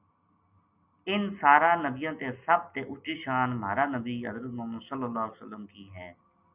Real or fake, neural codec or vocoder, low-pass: real; none; 3.6 kHz